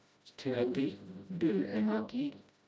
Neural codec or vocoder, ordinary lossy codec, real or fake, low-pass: codec, 16 kHz, 0.5 kbps, FreqCodec, smaller model; none; fake; none